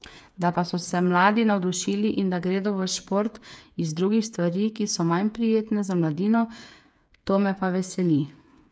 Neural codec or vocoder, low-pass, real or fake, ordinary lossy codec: codec, 16 kHz, 8 kbps, FreqCodec, smaller model; none; fake; none